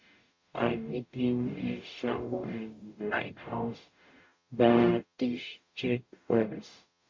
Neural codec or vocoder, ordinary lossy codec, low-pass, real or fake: codec, 44.1 kHz, 0.9 kbps, DAC; MP3, 48 kbps; 7.2 kHz; fake